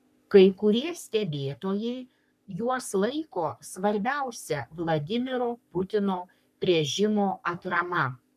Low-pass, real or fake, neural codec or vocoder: 14.4 kHz; fake; codec, 44.1 kHz, 3.4 kbps, Pupu-Codec